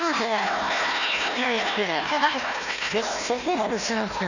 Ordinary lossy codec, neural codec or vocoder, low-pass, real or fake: none; codec, 16 kHz, 1 kbps, FunCodec, trained on Chinese and English, 50 frames a second; 7.2 kHz; fake